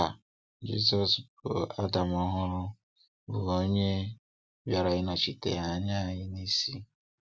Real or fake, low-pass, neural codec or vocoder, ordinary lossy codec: real; none; none; none